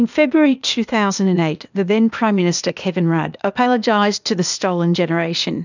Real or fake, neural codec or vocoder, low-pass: fake; codec, 16 kHz, 0.8 kbps, ZipCodec; 7.2 kHz